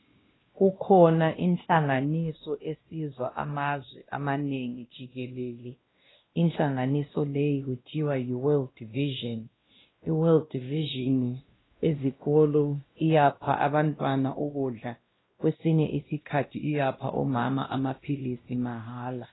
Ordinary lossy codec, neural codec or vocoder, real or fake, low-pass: AAC, 16 kbps; codec, 16 kHz, 1 kbps, X-Codec, WavLM features, trained on Multilingual LibriSpeech; fake; 7.2 kHz